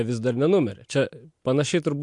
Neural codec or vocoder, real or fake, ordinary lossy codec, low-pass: none; real; MP3, 64 kbps; 10.8 kHz